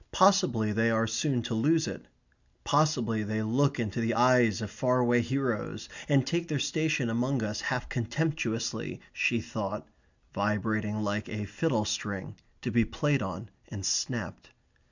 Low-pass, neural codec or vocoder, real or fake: 7.2 kHz; none; real